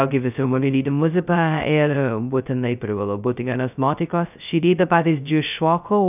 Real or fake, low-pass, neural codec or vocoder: fake; 3.6 kHz; codec, 16 kHz, 0.2 kbps, FocalCodec